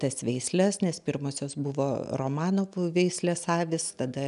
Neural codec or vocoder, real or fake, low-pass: none; real; 10.8 kHz